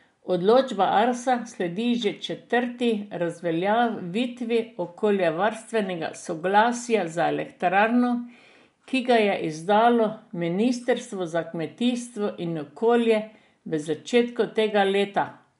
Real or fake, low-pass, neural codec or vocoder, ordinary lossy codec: real; 10.8 kHz; none; MP3, 64 kbps